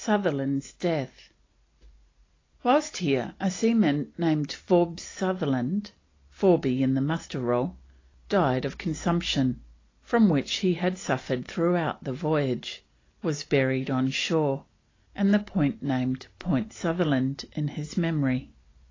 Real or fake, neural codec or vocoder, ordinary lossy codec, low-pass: real; none; AAC, 32 kbps; 7.2 kHz